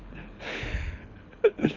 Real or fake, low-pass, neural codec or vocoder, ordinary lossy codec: fake; 7.2 kHz; codec, 24 kHz, 6 kbps, HILCodec; none